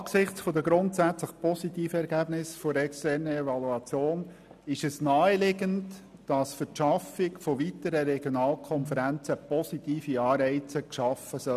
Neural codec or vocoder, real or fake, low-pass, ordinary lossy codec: none; real; 14.4 kHz; none